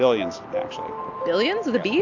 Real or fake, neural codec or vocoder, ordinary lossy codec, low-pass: real; none; AAC, 48 kbps; 7.2 kHz